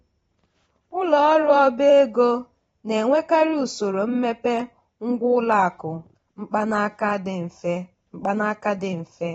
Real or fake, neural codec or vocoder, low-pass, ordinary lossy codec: fake; vocoder, 44.1 kHz, 128 mel bands every 512 samples, BigVGAN v2; 19.8 kHz; AAC, 24 kbps